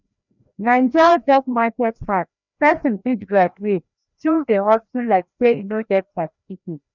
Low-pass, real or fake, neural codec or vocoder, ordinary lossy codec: 7.2 kHz; fake; codec, 16 kHz, 1 kbps, FreqCodec, larger model; none